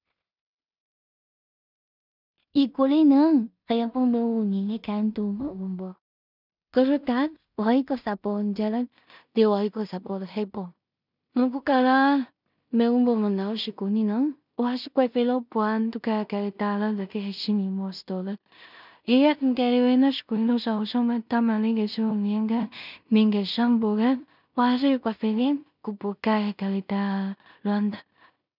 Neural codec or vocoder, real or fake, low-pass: codec, 16 kHz in and 24 kHz out, 0.4 kbps, LongCat-Audio-Codec, two codebook decoder; fake; 5.4 kHz